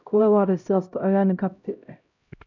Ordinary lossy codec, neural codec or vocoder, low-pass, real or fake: none; codec, 16 kHz, 0.5 kbps, X-Codec, HuBERT features, trained on LibriSpeech; 7.2 kHz; fake